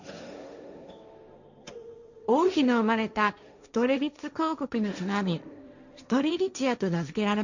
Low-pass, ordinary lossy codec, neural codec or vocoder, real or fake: 7.2 kHz; none; codec, 16 kHz, 1.1 kbps, Voila-Tokenizer; fake